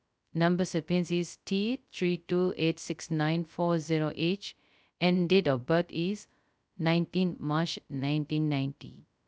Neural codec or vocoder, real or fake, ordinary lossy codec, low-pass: codec, 16 kHz, 0.2 kbps, FocalCodec; fake; none; none